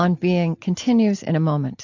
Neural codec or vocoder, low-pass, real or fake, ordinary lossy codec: none; 7.2 kHz; real; AAC, 48 kbps